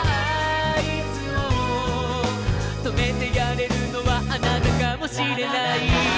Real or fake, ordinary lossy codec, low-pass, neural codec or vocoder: real; none; none; none